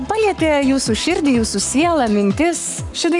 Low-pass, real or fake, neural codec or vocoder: 10.8 kHz; fake; codec, 44.1 kHz, 7.8 kbps, Pupu-Codec